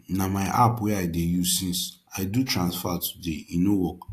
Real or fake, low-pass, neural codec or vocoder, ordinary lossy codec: real; 14.4 kHz; none; AAC, 64 kbps